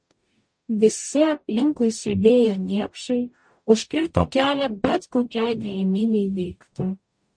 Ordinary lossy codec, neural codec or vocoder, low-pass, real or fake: MP3, 48 kbps; codec, 44.1 kHz, 0.9 kbps, DAC; 9.9 kHz; fake